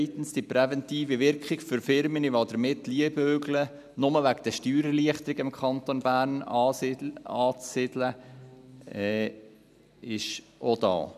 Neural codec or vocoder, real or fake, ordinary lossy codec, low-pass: none; real; none; 14.4 kHz